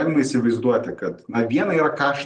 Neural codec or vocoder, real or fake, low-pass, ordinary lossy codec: none; real; 10.8 kHz; Opus, 24 kbps